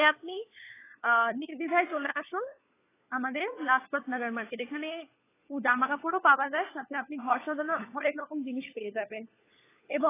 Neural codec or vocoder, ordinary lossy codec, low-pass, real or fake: codec, 16 kHz, 4 kbps, FunCodec, trained on LibriTTS, 50 frames a second; AAC, 16 kbps; 3.6 kHz; fake